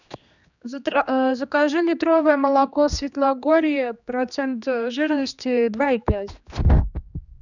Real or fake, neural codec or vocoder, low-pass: fake; codec, 16 kHz, 2 kbps, X-Codec, HuBERT features, trained on general audio; 7.2 kHz